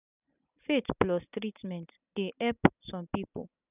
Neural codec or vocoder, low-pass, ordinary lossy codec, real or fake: none; 3.6 kHz; none; real